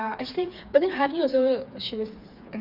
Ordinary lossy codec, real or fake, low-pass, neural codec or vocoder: none; fake; 5.4 kHz; codec, 16 kHz, 4 kbps, FreqCodec, smaller model